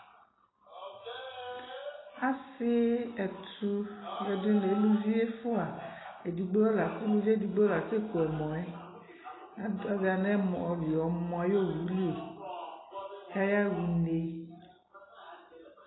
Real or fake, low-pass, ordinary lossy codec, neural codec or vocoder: real; 7.2 kHz; AAC, 16 kbps; none